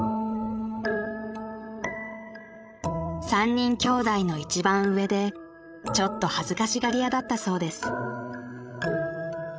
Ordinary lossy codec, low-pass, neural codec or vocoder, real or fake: none; none; codec, 16 kHz, 16 kbps, FreqCodec, larger model; fake